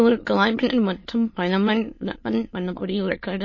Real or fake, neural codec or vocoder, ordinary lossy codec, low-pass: fake; autoencoder, 22.05 kHz, a latent of 192 numbers a frame, VITS, trained on many speakers; MP3, 32 kbps; 7.2 kHz